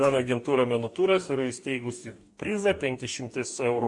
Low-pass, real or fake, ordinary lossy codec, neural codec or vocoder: 10.8 kHz; fake; MP3, 64 kbps; codec, 44.1 kHz, 2.6 kbps, DAC